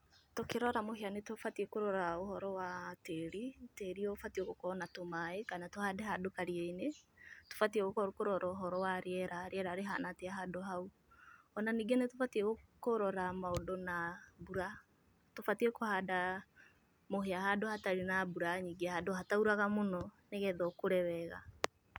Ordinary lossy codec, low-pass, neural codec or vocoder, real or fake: none; none; none; real